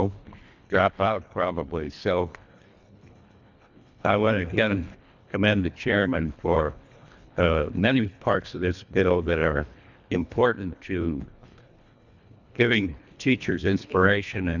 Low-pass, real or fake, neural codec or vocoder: 7.2 kHz; fake; codec, 24 kHz, 1.5 kbps, HILCodec